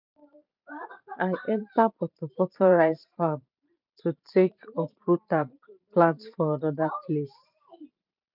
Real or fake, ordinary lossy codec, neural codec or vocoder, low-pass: fake; none; vocoder, 44.1 kHz, 128 mel bands every 512 samples, BigVGAN v2; 5.4 kHz